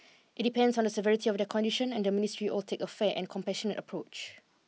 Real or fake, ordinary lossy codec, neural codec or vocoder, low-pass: real; none; none; none